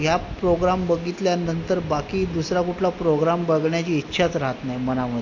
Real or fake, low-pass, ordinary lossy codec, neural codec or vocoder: real; 7.2 kHz; AAC, 48 kbps; none